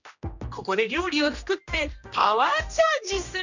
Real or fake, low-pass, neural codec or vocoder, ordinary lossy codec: fake; 7.2 kHz; codec, 16 kHz, 1 kbps, X-Codec, HuBERT features, trained on general audio; none